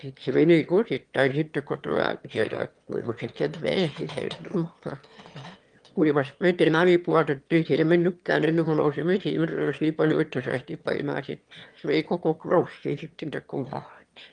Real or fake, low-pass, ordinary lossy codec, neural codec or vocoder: fake; 9.9 kHz; Opus, 32 kbps; autoencoder, 22.05 kHz, a latent of 192 numbers a frame, VITS, trained on one speaker